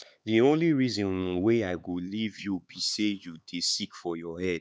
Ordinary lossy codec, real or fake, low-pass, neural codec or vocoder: none; fake; none; codec, 16 kHz, 4 kbps, X-Codec, HuBERT features, trained on LibriSpeech